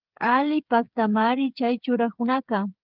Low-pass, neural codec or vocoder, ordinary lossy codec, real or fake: 5.4 kHz; codec, 16 kHz, 8 kbps, FreqCodec, smaller model; Opus, 32 kbps; fake